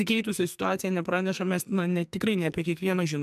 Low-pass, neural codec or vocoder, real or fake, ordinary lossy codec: 14.4 kHz; codec, 44.1 kHz, 2.6 kbps, SNAC; fake; MP3, 96 kbps